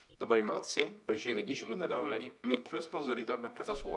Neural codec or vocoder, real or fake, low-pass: codec, 24 kHz, 0.9 kbps, WavTokenizer, medium music audio release; fake; 10.8 kHz